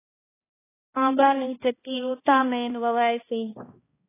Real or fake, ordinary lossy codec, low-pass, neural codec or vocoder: fake; AAC, 16 kbps; 3.6 kHz; codec, 16 kHz, 1 kbps, X-Codec, HuBERT features, trained on balanced general audio